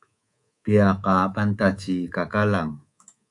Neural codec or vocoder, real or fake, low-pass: codec, 24 kHz, 3.1 kbps, DualCodec; fake; 10.8 kHz